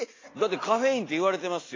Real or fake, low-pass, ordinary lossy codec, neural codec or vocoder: real; 7.2 kHz; AAC, 32 kbps; none